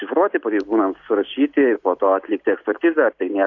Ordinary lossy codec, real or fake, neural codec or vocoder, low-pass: AAC, 48 kbps; real; none; 7.2 kHz